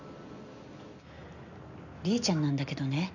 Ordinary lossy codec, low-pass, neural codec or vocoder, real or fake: none; 7.2 kHz; none; real